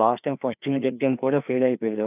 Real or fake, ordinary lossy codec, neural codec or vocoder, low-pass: fake; none; codec, 16 kHz, 2 kbps, FreqCodec, larger model; 3.6 kHz